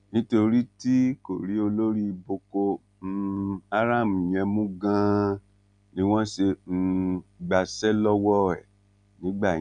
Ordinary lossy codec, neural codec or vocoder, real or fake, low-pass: none; none; real; 9.9 kHz